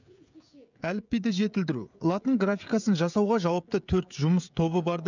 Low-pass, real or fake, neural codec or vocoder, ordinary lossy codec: 7.2 kHz; fake; codec, 16 kHz, 16 kbps, FreqCodec, smaller model; none